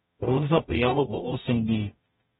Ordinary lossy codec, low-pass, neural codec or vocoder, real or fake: AAC, 16 kbps; 19.8 kHz; codec, 44.1 kHz, 0.9 kbps, DAC; fake